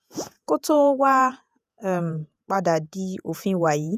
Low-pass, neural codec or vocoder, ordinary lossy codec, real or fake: 14.4 kHz; vocoder, 48 kHz, 128 mel bands, Vocos; none; fake